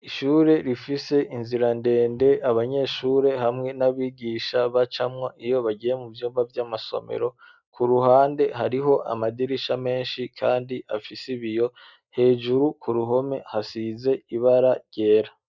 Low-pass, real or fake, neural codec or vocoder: 7.2 kHz; real; none